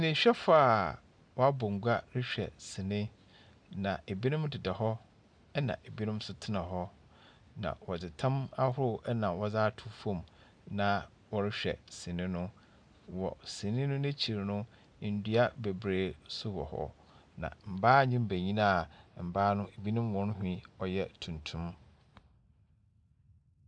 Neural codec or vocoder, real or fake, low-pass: none; real; 9.9 kHz